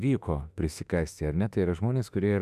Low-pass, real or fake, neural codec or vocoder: 14.4 kHz; fake; autoencoder, 48 kHz, 32 numbers a frame, DAC-VAE, trained on Japanese speech